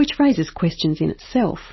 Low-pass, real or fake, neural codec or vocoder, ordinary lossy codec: 7.2 kHz; real; none; MP3, 24 kbps